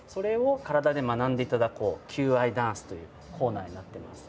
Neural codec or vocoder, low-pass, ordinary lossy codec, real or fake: none; none; none; real